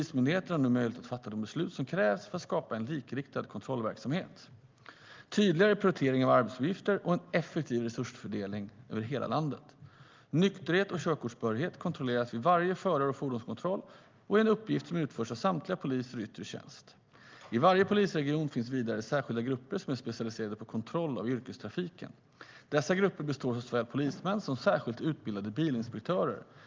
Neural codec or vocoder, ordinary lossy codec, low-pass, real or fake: none; Opus, 32 kbps; 7.2 kHz; real